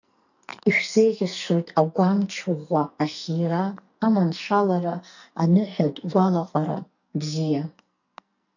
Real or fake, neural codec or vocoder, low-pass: fake; codec, 44.1 kHz, 2.6 kbps, SNAC; 7.2 kHz